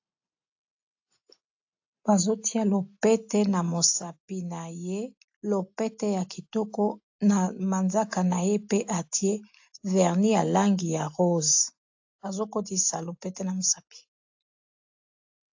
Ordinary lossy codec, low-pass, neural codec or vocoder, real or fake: AAC, 48 kbps; 7.2 kHz; none; real